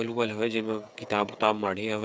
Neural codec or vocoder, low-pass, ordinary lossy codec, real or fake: codec, 16 kHz, 8 kbps, FreqCodec, smaller model; none; none; fake